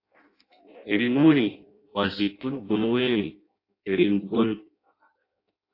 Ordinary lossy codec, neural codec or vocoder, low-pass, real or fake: AAC, 32 kbps; codec, 16 kHz in and 24 kHz out, 0.6 kbps, FireRedTTS-2 codec; 5.4 kHz; fake